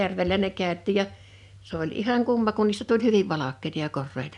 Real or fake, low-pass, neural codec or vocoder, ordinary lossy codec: real; 10.8 kHz; none; none